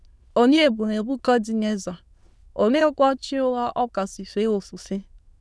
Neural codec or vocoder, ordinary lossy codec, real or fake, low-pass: autoencoder, 22.05 kHz, a latent of 192 numbers a frame, VITS, trained on many speakers; none; fake; none